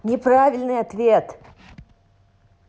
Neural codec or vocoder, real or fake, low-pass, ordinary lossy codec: none; real; none; none